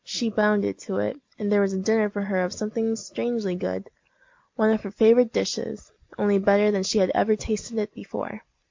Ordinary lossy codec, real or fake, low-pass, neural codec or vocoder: MP3, 48 kbps; real; 7.2 kHz; none